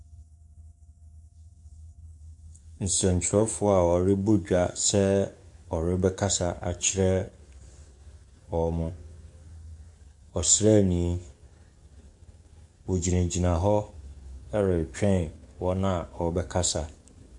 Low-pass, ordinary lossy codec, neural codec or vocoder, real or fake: 10.8 kHz; MP3, 64 kbps; codec, 44.1 kHz, 7.8 kbps, DAC; fake